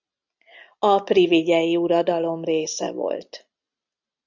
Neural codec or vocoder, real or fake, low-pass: none; real; 7.2 kHz